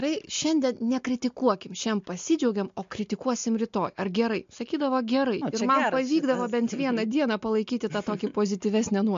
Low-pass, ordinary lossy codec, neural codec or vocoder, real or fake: 7.2 kHz; MP3, 48 kbps; none; real